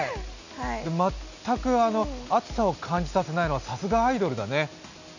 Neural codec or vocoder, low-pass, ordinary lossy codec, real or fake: none; 7.2 kHz; none; real